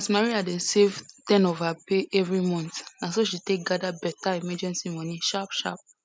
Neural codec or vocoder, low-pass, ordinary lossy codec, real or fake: none; none; none; real